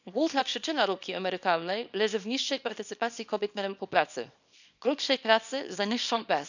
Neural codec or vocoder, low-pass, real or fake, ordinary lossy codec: codec, 24 kHz, 0.9 kbps, WavTokenizer, small release; 7.2 kHz; fake; none